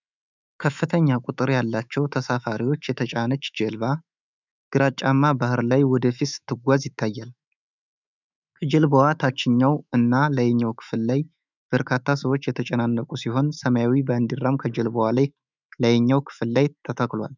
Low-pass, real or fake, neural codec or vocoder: 7.2 kHz; fake; autoencoder, 48 kHz, 128 numbers a frame, DAC-VAE, trained on Japanese speech